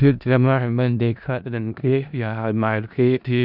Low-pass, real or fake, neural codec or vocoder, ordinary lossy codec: 5.4 kHz; fake; codec, 16 kHz in and 24 kHz out, 0.4 kbps, LongCat-Audio-Codec, four codebook decoder; none